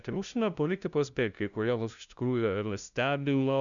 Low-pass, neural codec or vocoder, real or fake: 7.2 kHz; codec, 16 kHz, 0.5 kbps, FunCodec, trained on LibriTTS, 25 frames a second; fake